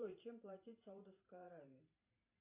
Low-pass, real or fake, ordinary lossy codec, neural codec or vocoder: 3.6 kHz; fake; MP3, 32 kbps; vocoder, 44.1 kHz, 128 mel bands every 512 samples, BigVGAN v2